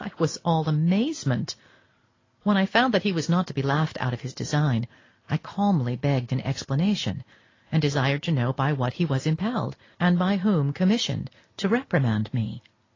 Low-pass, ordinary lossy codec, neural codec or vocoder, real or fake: 7.2 kHz; AAC, 32 kbps; none; real